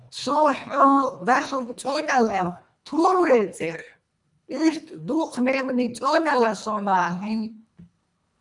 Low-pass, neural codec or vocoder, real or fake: 10.8 kHz; codec, 24 kHz, 1.5 kbps, HILCodec; fake